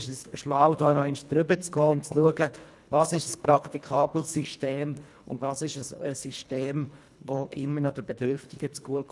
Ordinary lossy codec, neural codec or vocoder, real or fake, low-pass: none; codec, 24 kHz, 1.5 kbps, HILCodec; fake; none